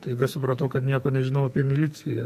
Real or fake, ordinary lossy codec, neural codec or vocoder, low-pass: fake; MP3, 64 kbps; codec, 44.1 kHz, 2.6 kbps, SNAC; 14.4 kHz